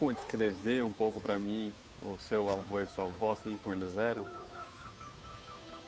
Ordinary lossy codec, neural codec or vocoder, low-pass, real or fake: none; codec, 16 kHz, 2 kbps, FunCodec, trained on Chinese and English, 25 frames a second; none; fake